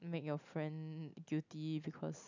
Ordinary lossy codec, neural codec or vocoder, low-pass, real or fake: none; none; 7.2 kHz; real